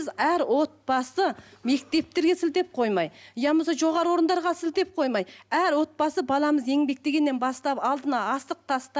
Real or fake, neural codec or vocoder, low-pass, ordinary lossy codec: real; none; none; none